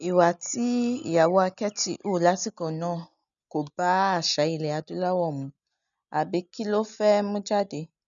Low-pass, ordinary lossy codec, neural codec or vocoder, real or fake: 7.2 kHz; none; none; real